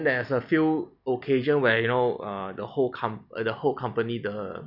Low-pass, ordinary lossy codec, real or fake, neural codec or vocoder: 5.4 kHz; none; real; none